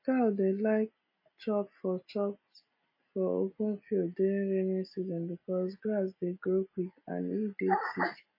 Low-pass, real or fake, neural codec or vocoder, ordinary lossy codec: 5.4 kHz; real; none; MP3, 24 kbps